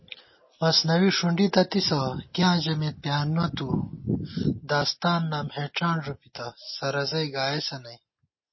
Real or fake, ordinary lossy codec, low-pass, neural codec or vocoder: real; MP3, 24 kbps; 7.2 kHz; none